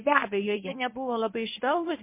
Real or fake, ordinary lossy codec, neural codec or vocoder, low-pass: fake; MP3, 24 kbps; codec, 24 kHz, 0.9 kbps, WavTokenizer, medium speech release version 1; 3.6 kHz